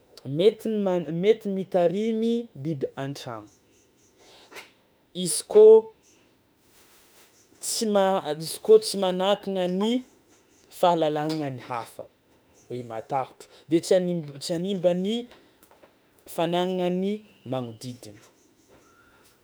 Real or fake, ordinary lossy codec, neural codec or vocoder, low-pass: fake; none; autoencoder, 48 kHz, 32 numbers a frame, DAC-VAE, trained on Japanese speech; none